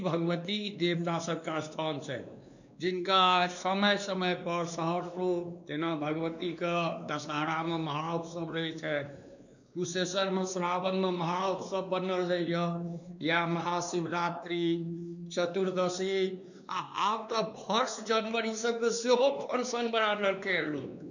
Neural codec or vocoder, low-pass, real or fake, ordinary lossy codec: codec, 16 kHz, 2 kbps, X-Codec, WavLM features, trained on Multilingual LibriSpeech; 7.2 kHz; fake; none